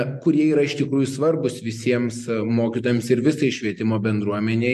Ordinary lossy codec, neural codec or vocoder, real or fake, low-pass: MP3, 64 kbps; none; real; 14.4 kHz